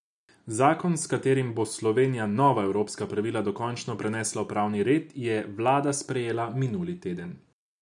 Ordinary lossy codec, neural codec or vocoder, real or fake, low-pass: none; none; real; 10.8 kHz